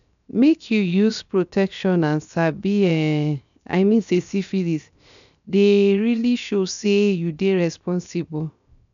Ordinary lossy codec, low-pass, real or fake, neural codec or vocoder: none; 7.2 kHz; fake; codec, 16 kHz, 0.7 kbps, FocalCodec